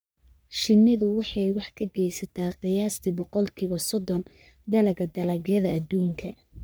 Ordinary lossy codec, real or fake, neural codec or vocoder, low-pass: none; fake; codec, 44.1 kHz, 3.4 kbps, Pupu-Codec; none